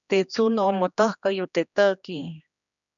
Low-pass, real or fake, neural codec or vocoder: 7.2 kHz; fake; codec, 16 kHz, 2 kbps, X-Codec, HuBERT features, trained on general audio